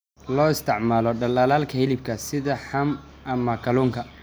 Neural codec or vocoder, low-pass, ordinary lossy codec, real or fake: none; none; none; real